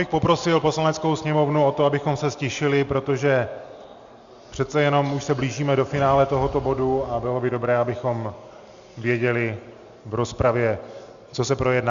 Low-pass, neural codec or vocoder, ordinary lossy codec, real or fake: 7.2 kHz; none; Opus, 64 kbps; real